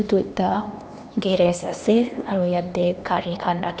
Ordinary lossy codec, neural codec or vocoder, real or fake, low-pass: none; codec, 16 kHz, 2 kbps, X-Codec, HuBERT features, trained on LibriSpeech; fake; none